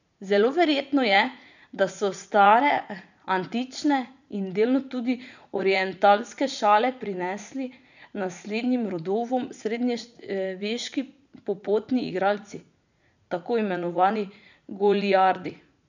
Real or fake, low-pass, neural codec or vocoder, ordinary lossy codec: fake; 7.2 kHz; vocoder, 44.1 kHz, 80 mel bands, Vocos; none